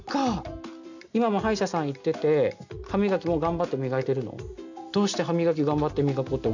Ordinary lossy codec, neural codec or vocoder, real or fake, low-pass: none; none; real; 7.2 kHz